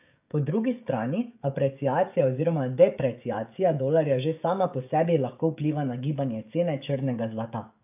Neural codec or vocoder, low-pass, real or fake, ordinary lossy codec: codec, 16 kHz, 16 kbps, FreqCodec, smaller model; 3.6 kHz; fake; AAC, 32 kbps